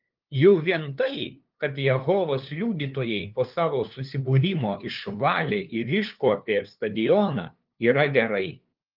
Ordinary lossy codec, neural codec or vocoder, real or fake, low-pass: Opus, 32 kbps; codec, 16 kHz, 2 kbps, FunCodec, trained on LibriTTS, 25 frames a second; fake; 5.4 kHz